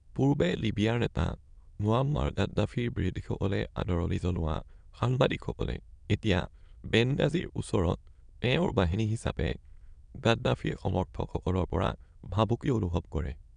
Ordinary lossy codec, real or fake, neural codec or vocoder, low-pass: none; fake; autoencoder, 22.05 kHz, a latent of 192 numbers a frame, VITS, trained on many speakers; 9.9 kHz